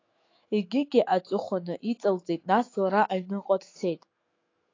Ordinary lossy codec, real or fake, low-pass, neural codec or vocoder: AAC, 32 kbps; fake; 7.2 kHz; autoencoder, 48 kHz, 128 numbers a frame, DAC-VAE, trained on Japanese speech